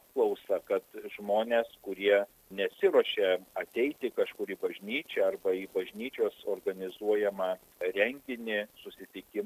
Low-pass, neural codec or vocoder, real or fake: 14.4 kHz; none; real